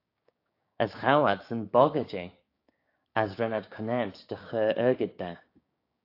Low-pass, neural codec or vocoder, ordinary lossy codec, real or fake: 5.4 kHz; codec, 16 kHz, 6 kbps, DAC; AAC, 32 kbps; fake